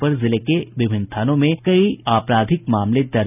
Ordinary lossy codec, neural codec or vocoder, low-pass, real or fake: none; none; 3.6 kHz; real